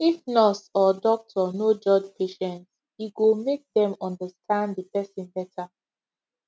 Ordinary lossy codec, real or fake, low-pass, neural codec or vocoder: none; real; none; none